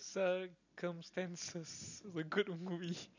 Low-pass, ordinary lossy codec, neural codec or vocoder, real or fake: 7.2 kHz; none; none; real